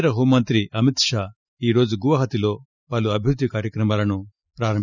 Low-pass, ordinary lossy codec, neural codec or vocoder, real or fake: 7.2 kHz; none; none; real